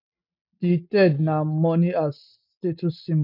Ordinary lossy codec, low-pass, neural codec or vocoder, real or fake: none; 5.4 kHz; none; real